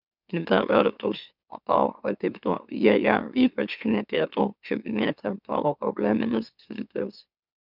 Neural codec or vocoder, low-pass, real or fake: autoencoder, 44.1 kHz, a latent of 192 numbers a frame, MeloTTS; 5.4 kHz; fake